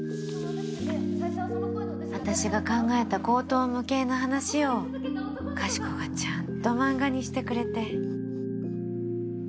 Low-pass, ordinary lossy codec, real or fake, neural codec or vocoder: none; none; real; none